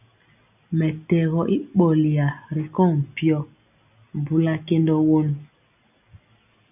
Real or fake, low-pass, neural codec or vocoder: real; 3.6 kHz; none